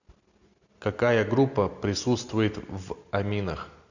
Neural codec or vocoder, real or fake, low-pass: none; real; 7.2 kHz